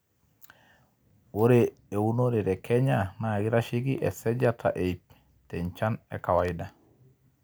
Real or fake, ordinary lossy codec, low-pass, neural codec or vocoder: real; none; none; none